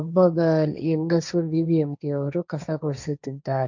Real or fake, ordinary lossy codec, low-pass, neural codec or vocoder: fake; none; 7.2 kHz; codec, 16 kHz, 1.1 kbps, Voila-Tokenizer